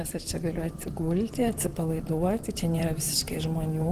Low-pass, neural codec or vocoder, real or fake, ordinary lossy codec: 14.4 kHz; vocoder, 44.1 kHz, 128 mel bands, Pupu-Vocoder; fake; Opus, 16 kbps